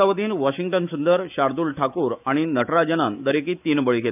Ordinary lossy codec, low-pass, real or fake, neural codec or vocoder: none; 3.6 kHz; fake; autoencoder, 48 kHz, 128 numbers a frame, DAC-VAE, trained on Japanese speech